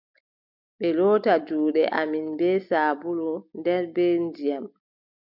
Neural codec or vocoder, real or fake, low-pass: none; real; 5.4 kHz